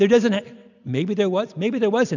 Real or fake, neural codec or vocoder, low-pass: real; none; 7.2 kHz